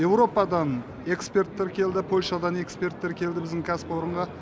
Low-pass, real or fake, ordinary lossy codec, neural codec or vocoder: none; real; none; none